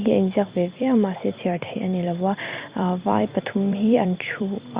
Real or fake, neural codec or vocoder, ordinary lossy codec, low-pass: fake; vocoder, 44.1 kHz, 128 mel bands every 256 samples, BigVGAN v2; Opus, 64 kbps; 3.6 kHz